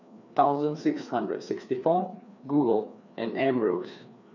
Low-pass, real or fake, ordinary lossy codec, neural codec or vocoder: 7.2 kHz; fake; none; codec, 16 kHz, 2 kbps, FreqCodec, larger model